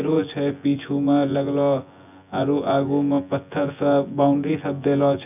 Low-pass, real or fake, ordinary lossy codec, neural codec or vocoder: 3.6 kHz; fake; none; vocoder, 24 kHz, 100 mel bands, Vocos